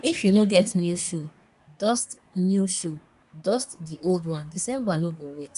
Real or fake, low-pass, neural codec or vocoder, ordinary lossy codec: fake; 10.8 kHz; codec, 24 kHz, 1 kbps, SNAC; none